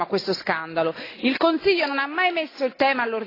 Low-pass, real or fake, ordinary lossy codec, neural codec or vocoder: 5.4 kHz; real; AAC, 24 kbps; none